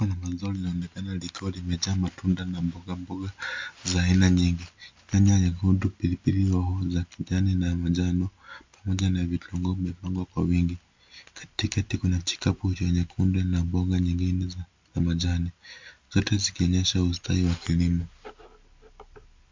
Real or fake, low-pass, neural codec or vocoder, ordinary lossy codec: real; 7.2 kHz; none; MP3, 64 kbps